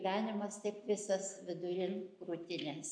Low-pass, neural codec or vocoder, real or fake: 9.9 kHz; none; real